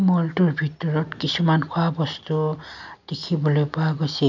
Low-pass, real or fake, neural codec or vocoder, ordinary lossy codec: 7.2 kHz; real; none; none